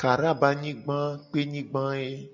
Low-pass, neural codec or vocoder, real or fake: 7.2 kHz; none; real